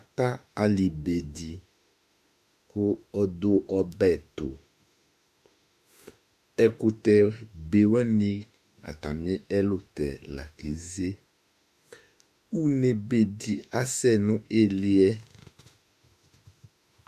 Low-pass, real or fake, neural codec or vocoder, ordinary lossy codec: 14.4 kHz; fake; autoencoder, 48 kHz, 32 numbers a frame, DAC-VAE, trained on Japanese speech; AAC, 96 kbps